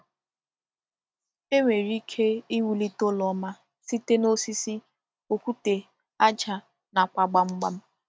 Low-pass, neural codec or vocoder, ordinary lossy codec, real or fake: none; none; none; real